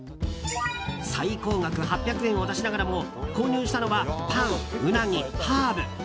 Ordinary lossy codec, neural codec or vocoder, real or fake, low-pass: none; none; real; none